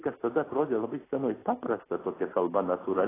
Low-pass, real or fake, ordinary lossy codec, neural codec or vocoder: 3.6 kHz; real; AAC, 16 kbps; none